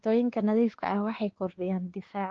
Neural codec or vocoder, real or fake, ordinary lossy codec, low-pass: codec, 16 kHz, 2 kbps, X-Codec, WavLM features, trained on Multilingual LibriSpeech; fake; Opus, 16 kbps; 7.2 kHz